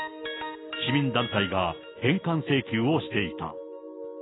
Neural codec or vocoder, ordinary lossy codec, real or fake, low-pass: none; AAC, 16 kbps; real; 7.2 kHz